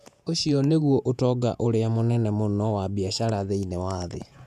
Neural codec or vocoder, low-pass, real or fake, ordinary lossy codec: vocoder, 48 kHz, 128 mel bands, Vocos; 14.4 kHz; fake; none